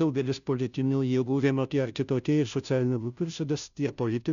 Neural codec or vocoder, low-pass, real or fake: codec, 16 kHz, 0.5 kbps, FunCodec, trained on Chinese and English, 25 frames a second; 7.2 kHz; fake